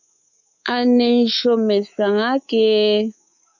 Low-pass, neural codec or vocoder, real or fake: 7.2 kHz; codec, 24 kHz, 3.1 kbps, DualCodec; fake